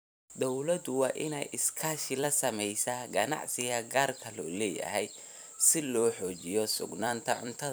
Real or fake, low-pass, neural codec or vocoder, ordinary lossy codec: fake; none; vocoder, 44.1 kHz, 128 mel bands every 256 samples, BigVGAN v2; none